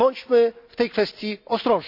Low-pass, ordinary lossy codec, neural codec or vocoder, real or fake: 5.4 kHz; none; none; real